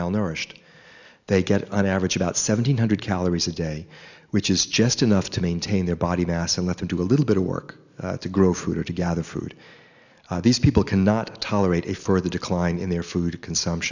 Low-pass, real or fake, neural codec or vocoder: 7.2 kHz; real; none